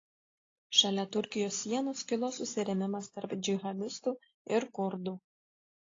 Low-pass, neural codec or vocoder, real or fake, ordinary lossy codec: 7.2 kHz; none; real; AAC, 32 kbps